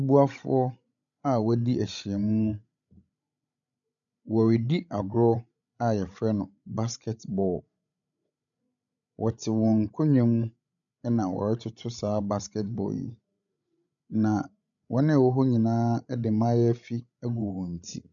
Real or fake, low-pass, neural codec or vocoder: fake; 7.2 kHz; codec, 16 kHz, 16 kbps, FreqCodec, larger model